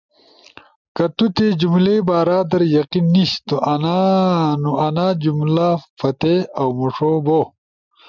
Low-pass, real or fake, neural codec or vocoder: 7.2 kHz; real; none